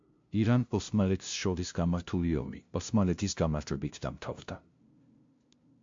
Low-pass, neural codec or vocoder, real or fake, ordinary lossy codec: 7.2 kHz; codec, 16 kHz, 0.5 kbps, FunCodec, trained on LibriTTS, 25 frames a second; fake; MP3, 48 kbps